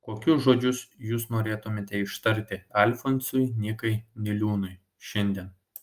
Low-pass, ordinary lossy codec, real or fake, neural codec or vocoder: 14.4 kHz; Opus, 32 kbps; real; none